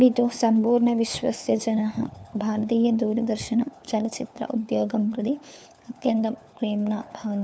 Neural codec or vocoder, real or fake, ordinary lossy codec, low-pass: codec, 16 kHz, 4 kbps, FunCodec, trained on LibriTTS, 50 frames a second; fake; none; none